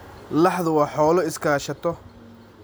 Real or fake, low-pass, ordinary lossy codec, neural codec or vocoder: real; none; none; none